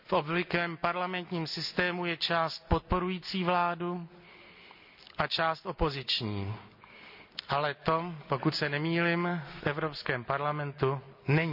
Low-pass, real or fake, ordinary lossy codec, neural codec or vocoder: 5.4 kHz; real; none; none